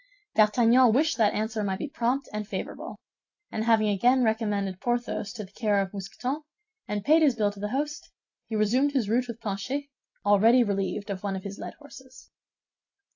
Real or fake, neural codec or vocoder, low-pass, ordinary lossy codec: real; none; 7.2 kHz; AAC, 48 kbps